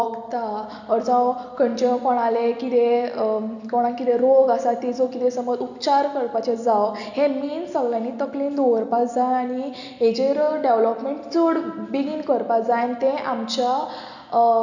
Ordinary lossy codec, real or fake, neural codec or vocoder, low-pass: none; real; none; 7.2 kHz